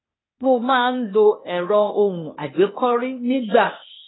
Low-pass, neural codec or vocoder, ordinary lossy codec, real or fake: 7.2 kHz; codec, 16 kHz, 0.8 kbps, ZipCodec; AAC, 16 kbps; fake